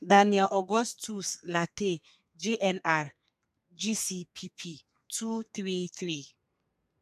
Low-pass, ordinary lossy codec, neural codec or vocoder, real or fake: 14.4 kHz; none; codec, 32 kHz, 1.9 kbps, SNAC; fake